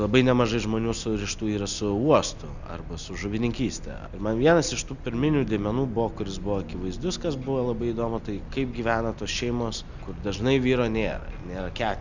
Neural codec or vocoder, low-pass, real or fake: none; 7.2 kHz; real